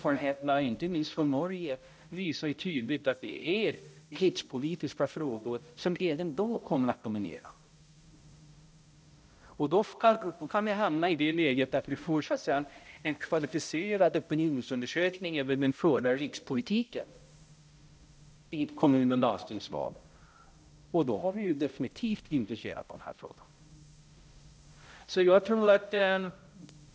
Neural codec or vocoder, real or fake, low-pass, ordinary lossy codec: codec, 16 kHz, 0.5 kbps, X-Codec, HuBERT features, trained on balanced general audio; fake; none; none